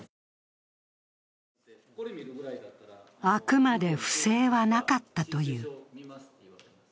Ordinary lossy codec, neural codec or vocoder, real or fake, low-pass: none; none; real; none